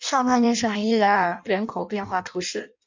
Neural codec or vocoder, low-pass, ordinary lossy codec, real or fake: codec, 16 kHz in and 24 kHz out, 0.6 kbps, FireRedTTS-2 codec; 7.2 kHz; MP3, 64 kbps; fake